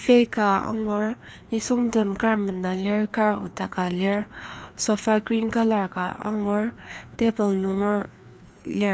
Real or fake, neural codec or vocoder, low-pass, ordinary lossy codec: fake; codec, 16 kHz, 2 kbps, FreqCodec, larger model; none; none